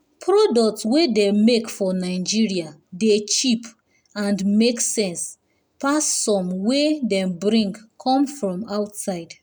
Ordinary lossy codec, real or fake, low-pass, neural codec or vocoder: none; real; none; none